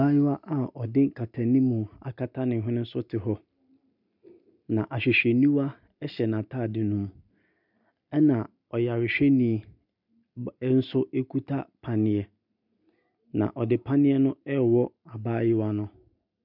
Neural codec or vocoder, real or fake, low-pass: none; real; 5.4 kHz